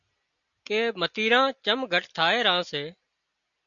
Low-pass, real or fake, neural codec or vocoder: 7.2 kHz; real; none